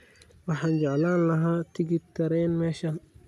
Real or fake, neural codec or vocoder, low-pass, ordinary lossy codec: real; none; 14.4 kHz; none